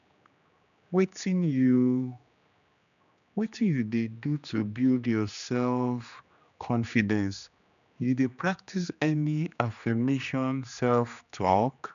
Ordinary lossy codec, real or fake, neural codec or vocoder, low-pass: none; fake; codec, 16 kHz, 2 kbps, X-Codec, HuBERT features, trained on general audio; 7.2 kHz